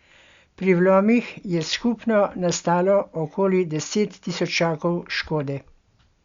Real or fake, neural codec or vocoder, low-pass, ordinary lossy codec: real; none; 7.2 kHz; Opus, 64 kbps